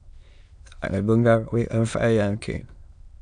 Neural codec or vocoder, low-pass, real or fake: autoencoder, 22.05 kHz, a latent of 192 numbers a frame, VITS, trained on many speakers; 9.9 kHz; fake